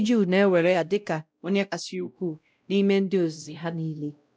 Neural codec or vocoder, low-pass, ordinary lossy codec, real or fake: codec, 16 kHz, 0.5 kbps, X-Codec, WavLM features, trained on Multilingual LibriSpeech; none; none; fake